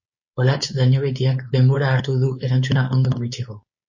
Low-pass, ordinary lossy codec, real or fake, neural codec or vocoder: 7.2 kHz; MP3, 32 kbps; fake; codec, 16 kHz, 4.8 kbps, FACodec